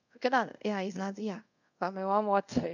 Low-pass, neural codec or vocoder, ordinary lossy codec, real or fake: 7.2 kHz; codec, 24 kHz, 0.5 kbps, DualCodec; none; fake